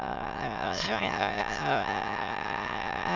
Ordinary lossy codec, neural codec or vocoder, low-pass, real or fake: none; autoencoder, 22.05 kHz, a latent of 192 numbers a frame, VITS, trained on many speakers; 7.2 kHz; fake